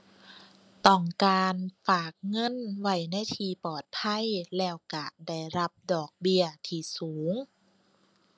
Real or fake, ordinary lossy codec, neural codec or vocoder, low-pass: real; none; none; none